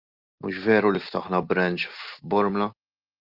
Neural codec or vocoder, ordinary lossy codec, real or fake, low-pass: none; Opus, 24 kbps; real; 5.4 kHz